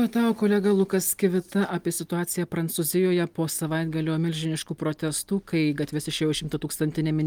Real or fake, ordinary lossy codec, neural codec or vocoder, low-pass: real; Opus, 32 kbps; none; 19.8 kHz